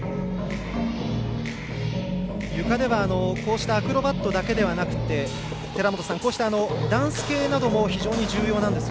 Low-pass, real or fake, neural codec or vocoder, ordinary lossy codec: none; real; none; none